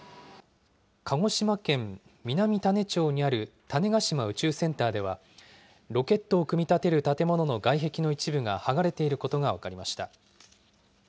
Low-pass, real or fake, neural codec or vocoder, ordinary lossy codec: none; real; none; none